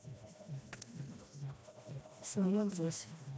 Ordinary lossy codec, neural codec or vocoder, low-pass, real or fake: none; codec, 16 kHz, 1 kbps, FreqCodec, smaller model; none; fake